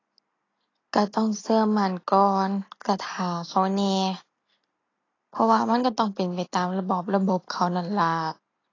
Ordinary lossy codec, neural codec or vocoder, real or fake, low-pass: AAC, 32 kbps; none; real; 7.2 kHz